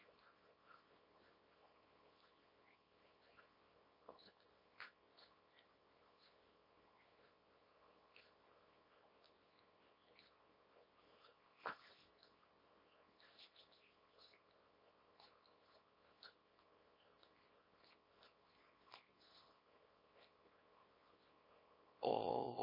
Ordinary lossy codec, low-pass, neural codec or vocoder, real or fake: MP3, 24 kbps; 5.4 kHz; codec, 24 kHz, 0.9 kbps, WavTokenizer, small release; fake